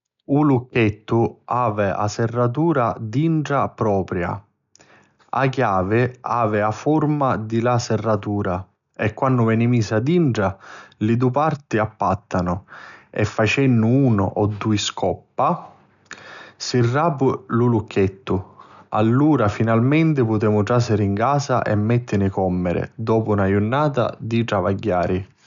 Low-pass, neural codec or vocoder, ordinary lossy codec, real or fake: 7.2 kHz; none; none; real